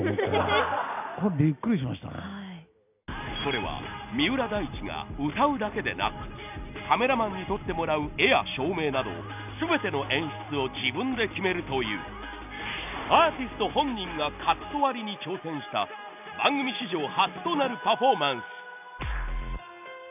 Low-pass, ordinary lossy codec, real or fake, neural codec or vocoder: 3.6 kHz; none; real; none